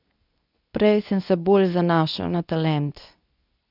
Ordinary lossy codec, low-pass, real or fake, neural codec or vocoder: none; 5.4 kHz; fake; codec, 24 kHz, 0.9 kbps, WavTokenizer, medium speech release version 2